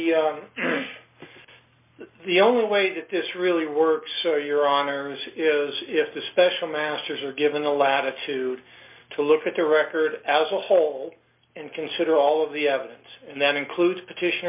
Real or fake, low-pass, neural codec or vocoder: real; 3.6 kHz; none